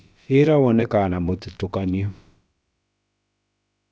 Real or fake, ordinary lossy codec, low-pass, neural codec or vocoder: fake; none; none; codec, 16 kHz, about 1 kbps, DyCAST, with the encoder's durations